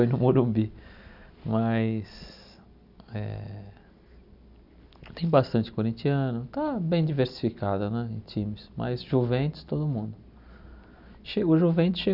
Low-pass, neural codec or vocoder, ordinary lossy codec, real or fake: 5.4 kHz; none; none; real